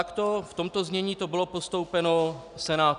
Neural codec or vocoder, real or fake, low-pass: none; real; 10.8 kHz